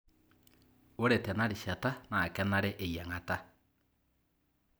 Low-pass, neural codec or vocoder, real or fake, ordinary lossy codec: none; none; real; none